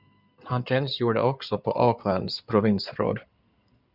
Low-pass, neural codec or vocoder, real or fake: 5.4 kHz; codec, 16 kHz in and 24 kHz out, 2.2 kbps, FireRedTTS-2 codec; fake